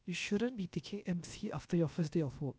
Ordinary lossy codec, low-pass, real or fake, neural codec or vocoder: none; none; fake; codec, 16 kHz, about 1 kbps, DyCAST, with the encoder's durations